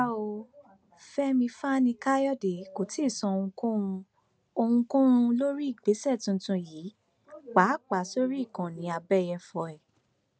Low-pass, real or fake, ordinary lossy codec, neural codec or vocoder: none; real; none; none